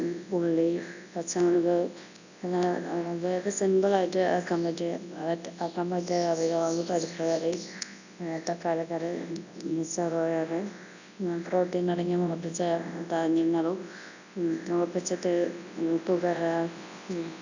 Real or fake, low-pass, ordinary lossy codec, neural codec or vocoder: fake; 7.2 kHz; none; codec, 24 kHz, 0.9 kbps, WavTokenizer, large speech release